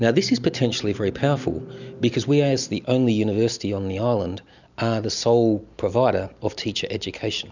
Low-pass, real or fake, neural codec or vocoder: 7.2 kHz; real; none